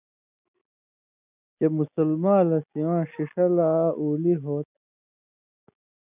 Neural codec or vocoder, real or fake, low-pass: none; real; 3.6 kHz